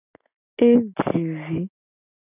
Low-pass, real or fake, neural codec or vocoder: 3.6 kHz; real; none